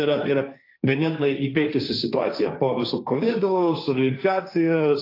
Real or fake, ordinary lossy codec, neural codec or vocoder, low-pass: fake; AAC, 32 kbps; codec, 16 kHz, 1.1 kbps, Voila-Tokenizer; 5.4 kHz